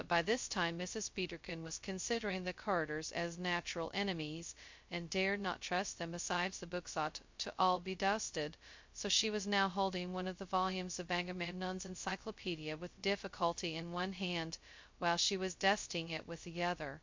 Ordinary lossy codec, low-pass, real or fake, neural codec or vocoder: MP3, 48 kbps; 7.2 kHz; fake; codec, 16 kHz, 0.2 kbps, FocalCodec